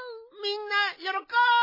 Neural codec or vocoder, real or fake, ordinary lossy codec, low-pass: none; real; MP3, 24 kbps; 5.4 kHz